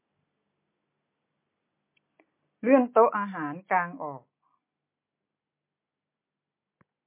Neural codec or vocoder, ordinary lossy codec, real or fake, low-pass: none; MP3, 24 kbps; real; 3.6 kHz